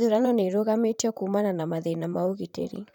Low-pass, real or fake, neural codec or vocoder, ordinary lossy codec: 19.8 kHz; fake; vocoder, 44.1 kHz, 128 mel bands, Pupu-Vocoder; none